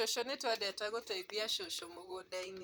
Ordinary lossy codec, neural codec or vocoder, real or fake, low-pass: none; vocoder, 44.1 kHz, 128 mel bands, Pupu-Vocoder; fake; none